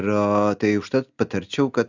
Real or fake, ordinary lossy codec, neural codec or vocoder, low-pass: real; Opus, 64 kbps; none; 7.2 kHz